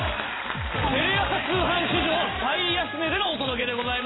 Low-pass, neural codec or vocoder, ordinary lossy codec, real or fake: 7.2 kHz; none; AAC, 16 kbps; real